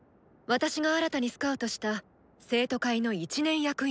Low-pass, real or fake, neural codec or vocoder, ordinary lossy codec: none; real; none; none